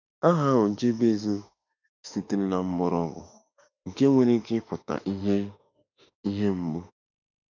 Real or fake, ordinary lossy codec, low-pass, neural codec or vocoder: fake; none; 7.2 kHz; autoencoder, 48 kHz, 32 numbers a frame, DAC-VAE, trained on Japanese speech